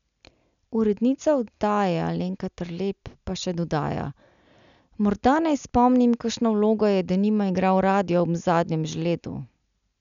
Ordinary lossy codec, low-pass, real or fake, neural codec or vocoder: none; 7.2 kHz; real; none